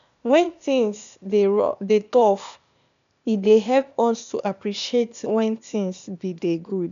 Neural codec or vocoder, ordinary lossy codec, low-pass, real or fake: codec, 16 kHz, 0.8 kbps, ZipCodec; none; 7.2 kHz; fake